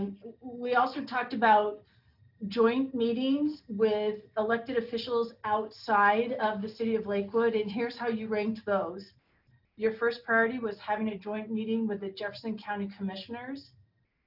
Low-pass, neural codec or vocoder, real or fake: 5.4 kHz; none; real